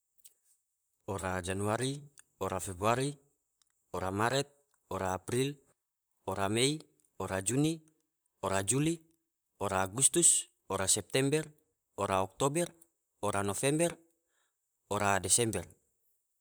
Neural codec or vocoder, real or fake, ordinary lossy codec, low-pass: vocoder, 44.1 kHz, 128 mel bands, Pupu-Vocoder; fake; none; none